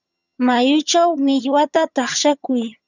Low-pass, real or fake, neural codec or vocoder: 7.2 kHz; fake; vocoder, 22.05 kHz, 80 mel bands, HiFi-GAN